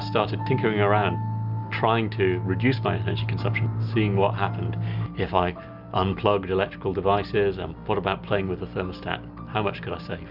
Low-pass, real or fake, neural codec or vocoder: 5.4 kHz; real; none